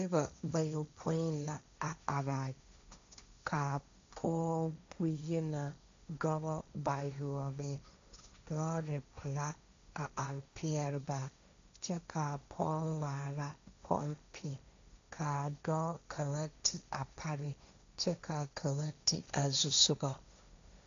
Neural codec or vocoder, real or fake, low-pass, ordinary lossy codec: codec, 16 kHz, 1.1 kbps, Voila-Tokenizer; fake; 7.2 kHz; AAC, 64 kbps